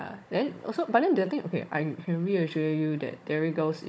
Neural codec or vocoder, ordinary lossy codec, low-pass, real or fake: codec, 16 kHz, 16 kbps, FunCodec, trained on Chinese and English, 50 frames a second; none; none; fake